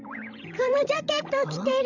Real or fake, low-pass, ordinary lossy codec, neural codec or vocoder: fake; 7.2 kHz; none; codec, 16 kHz, 16 kbps, FreqCodec, larger model